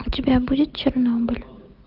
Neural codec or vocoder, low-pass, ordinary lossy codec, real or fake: none; 5.4 kHz; Opus, 24 kbps; real